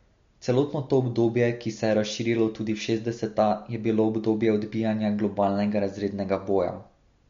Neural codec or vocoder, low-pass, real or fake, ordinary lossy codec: none; 7.2 kHz; real; MP3, 48 kbps